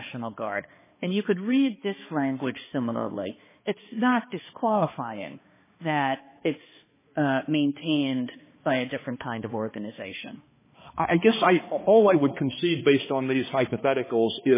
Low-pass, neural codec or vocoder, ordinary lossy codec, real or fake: 3.6 kHz; codec, 16 kHz, 2 kbps, X-Codec, HuBERT features, trained on balanced general audio; MP3, 16 kbps; fake